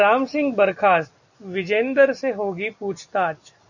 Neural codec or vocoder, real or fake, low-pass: none; real; 7.2 kHz